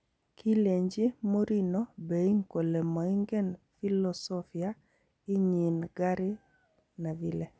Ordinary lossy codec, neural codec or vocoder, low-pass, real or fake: none; none; none; real